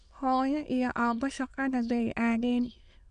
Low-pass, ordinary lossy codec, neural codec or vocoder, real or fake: 9.9 kHz; none; autoencoder, 22.05 kHz, a latent of 192 numbers a frame, VITS, trained on many speakers; fake